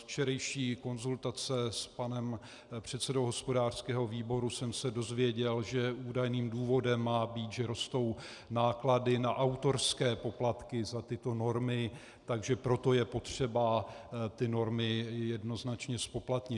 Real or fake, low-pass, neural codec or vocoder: real; 10.8 kHz; none